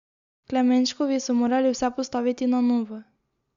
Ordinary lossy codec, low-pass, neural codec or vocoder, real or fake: Opus, 64 kbps; 7.2 kHz; none; real